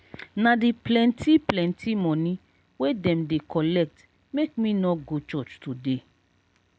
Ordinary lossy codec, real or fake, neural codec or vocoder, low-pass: none; real; none; none